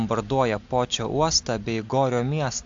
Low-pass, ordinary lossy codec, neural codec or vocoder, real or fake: 7.2 kHz; AAC, 64 kbps; none; real